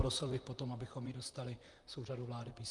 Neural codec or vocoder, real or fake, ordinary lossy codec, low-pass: vocoder, 44.1 kHz, 128 mel bands, Pupu-Vocoder; fake; Opus, 32 kbps; 10.8 kHz